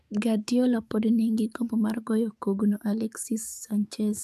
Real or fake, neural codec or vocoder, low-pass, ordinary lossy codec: fake; codec, 44.1 kHz, 7.8 kbps, DAC; 14.4 kHz; none